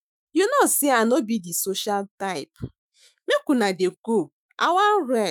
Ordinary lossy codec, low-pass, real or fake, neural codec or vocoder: none; none; fake; autoencoder, 48 kHz, 128 numbers a frame, DAC-VAE, trained on Japanese speech